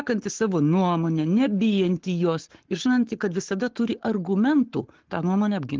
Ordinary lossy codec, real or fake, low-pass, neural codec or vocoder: Opus, 16 kbps; fake; 7.2 kHz; codec, 44.1 kHz, 7.8 kbps, Pupu-Codec